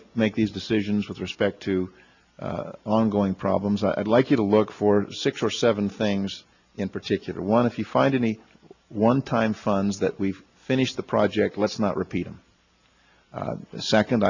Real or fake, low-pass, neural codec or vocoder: real; 7.2 kHz; none